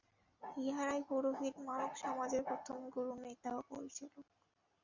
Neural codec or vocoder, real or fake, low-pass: none; real; 7.2 kHz